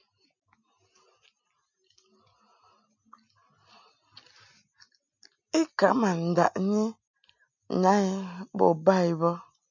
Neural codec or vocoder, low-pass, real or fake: none; 7.2 kHz; real